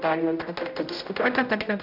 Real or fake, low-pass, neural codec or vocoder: fake; 5.4 kHz; codec, 16 kHz, 0.5 kbps, X-Codec, HuBERT features, trained on general audio